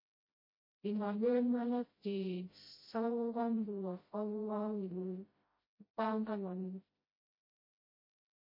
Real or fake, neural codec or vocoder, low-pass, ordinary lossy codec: fake; codec, 16 kHz, 0.5 kbps, FreqCodec, smaller model; 5.4 kHz; MP3, 24 kbps